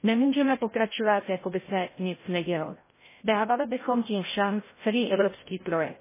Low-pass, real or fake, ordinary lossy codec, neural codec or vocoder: 3.6 kHz; fake; MP3, 16 kbps; codec, 16 kHz, 0.5 kbps, FreqCodec, larger model